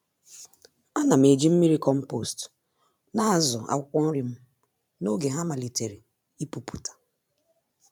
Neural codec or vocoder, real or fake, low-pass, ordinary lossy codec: vocoder, 44.1 kHz, 128 mel bands every 512 samples, BigVGAN v2; fake; 19.8 kHz; none